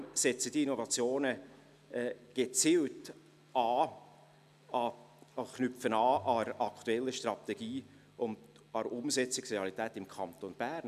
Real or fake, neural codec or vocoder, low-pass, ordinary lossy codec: real; none; 14.4 kHz; none